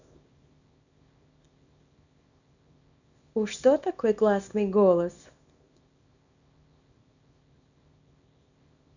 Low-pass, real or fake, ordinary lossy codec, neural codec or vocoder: 7.2 kHz; fake; none; codec, 24 kHz, 0.9 kbps, WavTokenizer, small release